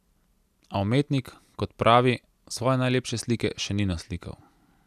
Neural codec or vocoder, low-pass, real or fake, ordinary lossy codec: none; 14.4 kHz; real; none